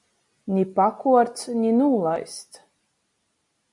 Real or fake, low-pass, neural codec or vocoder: real; 10.8 kHz; none